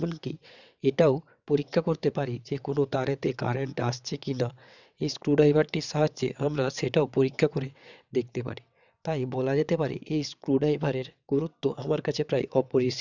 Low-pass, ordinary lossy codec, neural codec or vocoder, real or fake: 7.2 kHz; none; vocoder, 22.05 kHz, 80 mel bands, WaveNeXt; fake